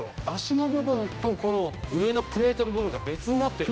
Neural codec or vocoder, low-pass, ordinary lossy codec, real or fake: codec, 16 kHz, 1 kbps, X-Codec, HuBERT features, trained on general audio; none; none; fake